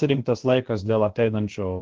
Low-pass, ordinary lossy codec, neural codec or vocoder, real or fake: 7.2 kHz; Opus, 16 kbps; codec, 16 kHz, about 1 kbps, DyCAST, with the encoder's durations; fake